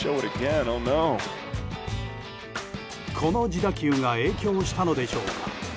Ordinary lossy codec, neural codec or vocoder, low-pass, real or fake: none; none; none; real